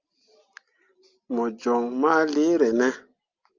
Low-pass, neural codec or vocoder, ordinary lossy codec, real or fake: 7.2 kHz; none; Opus, 32 kbps; real